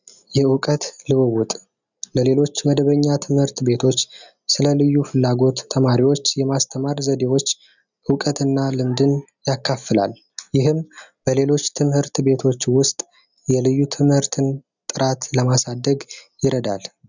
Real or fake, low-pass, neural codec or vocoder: real; 7.2 kHz; none